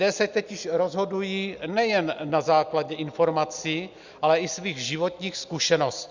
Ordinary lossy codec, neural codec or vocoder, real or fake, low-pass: Opus, 64 kbps; none; real; 7.2 kHz